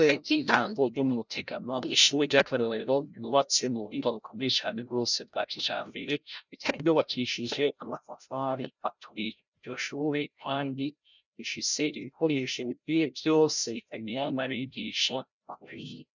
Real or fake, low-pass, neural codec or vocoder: fake; 7.2 kHz; codec, 16 kHz, 0.5 kbps, FreqCodec, larger model